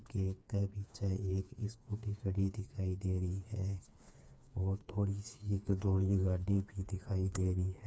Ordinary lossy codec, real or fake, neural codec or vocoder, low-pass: none; fake; codec, 16 kHz, 4 kbps, FreqCodec, smaller model; none